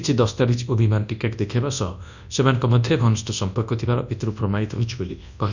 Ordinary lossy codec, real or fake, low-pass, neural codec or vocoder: none; fake; 7.2 kHz; codec, 24 kHz, 0.9 kbps, WavTokenizer, large speech release